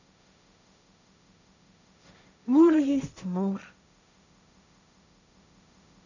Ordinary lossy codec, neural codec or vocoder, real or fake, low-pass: none; codec, 16 kHz, 1.1 kbps, Voila-Tokenizer; fake; 7.2 kHz